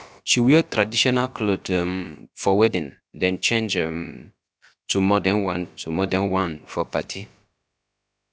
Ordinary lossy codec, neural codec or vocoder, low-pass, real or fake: none; codec, 16 kHz, about 1 kbps, DyCAST, with the encoder's durations; none; fake